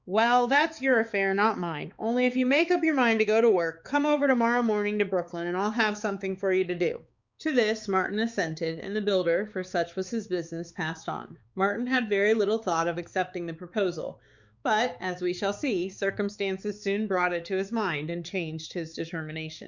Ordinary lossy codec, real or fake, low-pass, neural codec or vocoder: Opus, 64 kbps; fake; 7.2 kHz; codec, 16 kHz, 4 kbps, X-Codec, HuBERT features, trained on balanced general audio